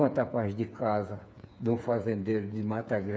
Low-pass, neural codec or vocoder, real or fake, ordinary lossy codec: none; codec, 16 kHz, 8 kbps, FreqCodec, smaller model; fake; none